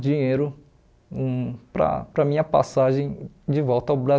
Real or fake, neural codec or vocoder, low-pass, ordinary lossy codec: real; none; none; none